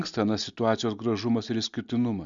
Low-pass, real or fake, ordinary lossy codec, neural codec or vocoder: 7.2 kHz; real; Opus, 64 kbps; none